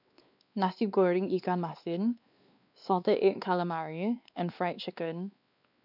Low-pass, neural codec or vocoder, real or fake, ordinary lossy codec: 5.4 kHz; codec, 16 kHz, 2 kbps, X-Codec, WavLM features, trained on Multilingual LibriSpeech; fake; none